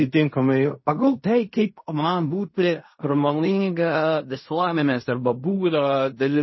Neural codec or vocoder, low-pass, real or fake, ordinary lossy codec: codec, 16 kHz in and 24 kHz out, 0.4 kbps, LongCat-Audio-Codec, fine tuned four codebook decoder; 7.2 kHz; fake; MP3, 24 kbps